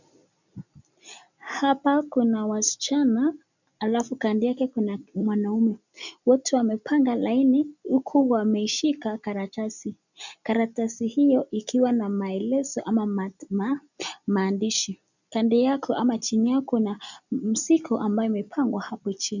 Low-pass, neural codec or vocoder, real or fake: 7.2 kHz; none; real